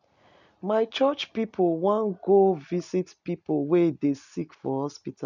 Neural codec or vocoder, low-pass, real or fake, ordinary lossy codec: none; 7.2 kHz; real; none